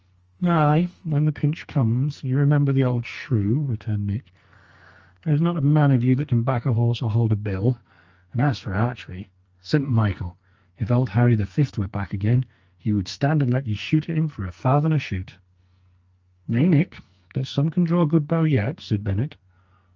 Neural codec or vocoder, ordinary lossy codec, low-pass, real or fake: codec, 44.1 kHz, 2.6 kbps, SNAC; Opus, 24 kbps; 7.2 kHz; fake